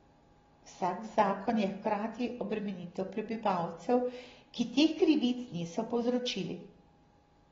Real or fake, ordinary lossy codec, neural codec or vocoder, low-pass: real; AAC, 24 kbps; none; 7.2 kHz